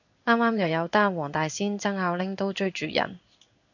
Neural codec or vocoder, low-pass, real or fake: codec, 16 kHz in and 24 kHz out, 1 kbps, XY-Tokenizer; 7.2 kHz; fake